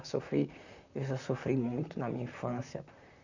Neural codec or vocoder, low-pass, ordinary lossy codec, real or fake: vocoder, 44.1 kHz, 128 mel bands, Pupu-Vocoder; 7.2 kHz; none; fake